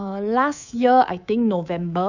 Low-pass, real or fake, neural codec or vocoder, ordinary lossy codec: 7.2 kHz; fake; codec, 24 kHz, 3.1 kbps, DualCodec; none